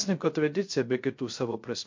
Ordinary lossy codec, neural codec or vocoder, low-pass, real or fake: MP3, 48 kbps; codec, 16 kHz, 0.3 kbps, FocalCodec; 7.2 kHz; fake